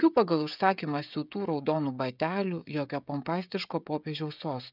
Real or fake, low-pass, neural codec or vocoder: fake; 5.4 kHz; codec, 16 kHz, 16 kbps, FreqCodec, smaller model